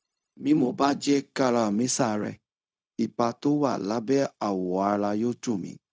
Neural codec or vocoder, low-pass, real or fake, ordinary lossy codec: codec, 16 kHz, 0.4 kbps, LongCat-Audio-Codec; none; fake; none